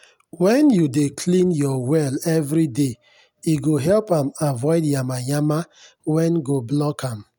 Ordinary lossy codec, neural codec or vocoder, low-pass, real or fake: none; none; none; real